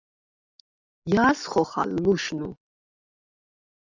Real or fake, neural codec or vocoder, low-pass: real; none; 7.2 kHz